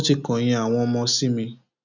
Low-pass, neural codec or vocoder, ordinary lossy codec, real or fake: 7.2 kHz; none; none; real